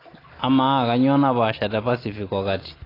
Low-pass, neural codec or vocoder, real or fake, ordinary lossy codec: 5.4 kHz; none; real; AAC, 24 kbps